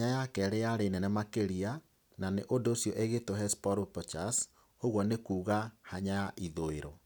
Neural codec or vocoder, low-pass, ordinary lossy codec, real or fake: none; none; none; real